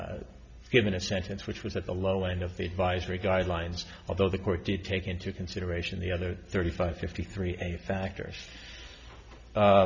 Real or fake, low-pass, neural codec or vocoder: real; 7.2 kHz; none